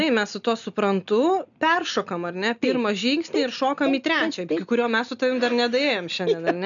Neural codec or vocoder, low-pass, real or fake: none; 7.2 kHz; real